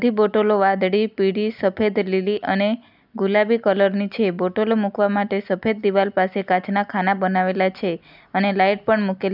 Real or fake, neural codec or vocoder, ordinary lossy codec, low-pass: real; none; none; 5.4 kHz